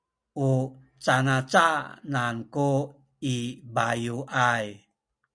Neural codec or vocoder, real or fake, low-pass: none; real; 9.9 kHz